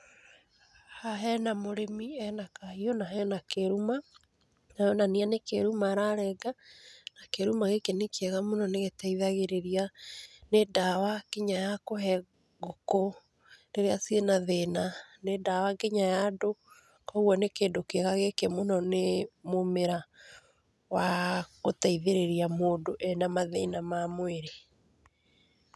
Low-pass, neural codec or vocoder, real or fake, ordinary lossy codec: none; none; real; none